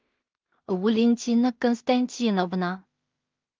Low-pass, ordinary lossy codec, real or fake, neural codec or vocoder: 7.2 kHz; Opus, 32 kbps; fake; codec, 16 kHz in and 24 kHz out, 0.4 kbps, LongCat-Audio-Codec, two codebook decoder